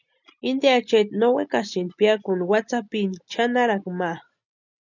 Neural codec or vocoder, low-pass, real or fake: none; 7.2 kHz; real